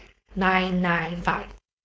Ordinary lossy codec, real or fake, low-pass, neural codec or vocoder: none; fake; none; codec, 16 kHz, 4.8 kbps, FACodec